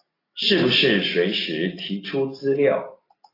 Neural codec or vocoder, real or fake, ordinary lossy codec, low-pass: none; real; AAC, 24 kbps; 5.4 kHz